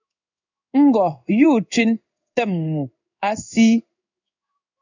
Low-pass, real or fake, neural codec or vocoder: 7.2 kHz; fake; codec, 16 kHz in and 24 kHz out, 1 kbps, XY-Tokenizer